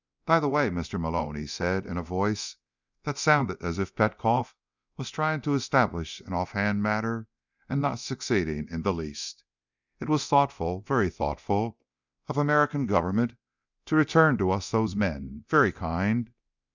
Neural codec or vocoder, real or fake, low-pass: codec, 24 kHz, 0.9 kbps, DualCodec; fake; 7.2 kHz